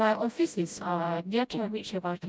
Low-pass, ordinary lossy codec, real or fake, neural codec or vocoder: none; none; fake; codec, 16 kHz, 0.5 kbps, FreqCodec, smaller model